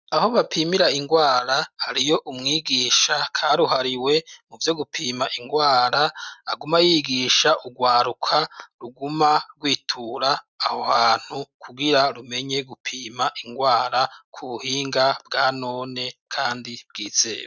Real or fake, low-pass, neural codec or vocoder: real; 7.2 kHz; none